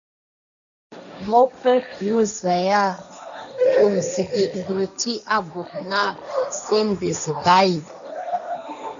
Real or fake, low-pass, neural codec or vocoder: fake; 7.2 kHz; codec, 16 kHz, 1.1 kbps, Voila-Tokenizer